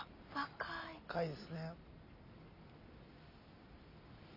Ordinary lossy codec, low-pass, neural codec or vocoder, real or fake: none; 5.4 kHz; none; real